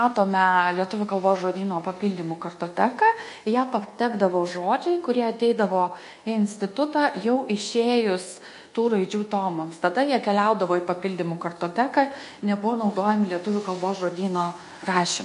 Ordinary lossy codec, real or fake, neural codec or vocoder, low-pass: MP3, 48 kbps; fake; codec, 24 kHz, 1.2 kbps, DualCodec; 10.8 kHz